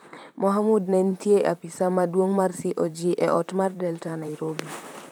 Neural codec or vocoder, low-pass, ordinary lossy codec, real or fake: vocoder, 44.1 kHz, 128 mel bands, Pupu-Vocoder; none; none; fake